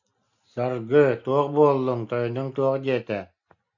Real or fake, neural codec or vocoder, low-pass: real; none; 7.2 kHz